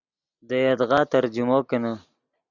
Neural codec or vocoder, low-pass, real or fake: none; 7.2 kHz; real